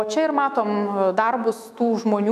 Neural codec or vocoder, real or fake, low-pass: autoencoder, 48 kHz, 128 numbers a frame, DAC-VAE, trained on Japanese speech; fake; 14.4 kHz